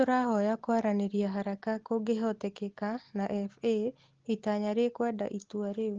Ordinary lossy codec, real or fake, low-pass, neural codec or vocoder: Opus, 16 kbps; real; 7.2 kHz; none